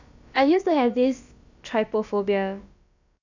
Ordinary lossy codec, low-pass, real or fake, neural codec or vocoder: none; 7.2 kHz; fake; codec, 16 kHz, about 1 kbps, DyCAST, with the encoder's durations